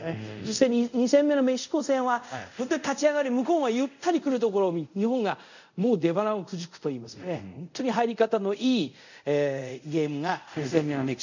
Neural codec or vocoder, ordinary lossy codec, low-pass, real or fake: codec, 24 kHz, 0.5 kbps, DualCodec; none; 7.2 kHz; fake